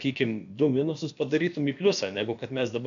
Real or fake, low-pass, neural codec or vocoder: fake; 7.2 kHz; codec, 16 kHz, about 1 kbps, DyCAST, with the encoder's durations